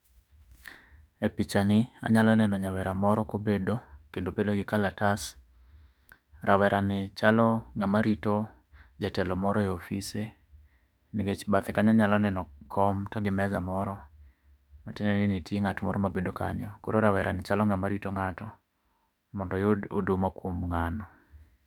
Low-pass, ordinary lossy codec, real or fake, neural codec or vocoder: 19.8 kHz; none; fake; autoencoder, 48 kHz, 32 numbers a frame, DAC-VAE, trained on Japanese speech